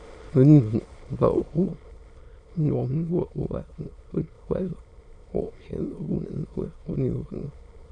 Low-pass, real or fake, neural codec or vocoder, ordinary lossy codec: 9.9 kHz; fake; autoencoder, 22.05 kHz, a latent of 192 numbers a frame, VITS, trained on many speakers; Opus, 64 kbps